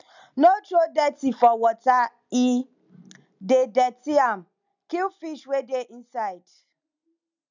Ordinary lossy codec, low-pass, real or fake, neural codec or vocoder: none; 7.2 kHz; real; none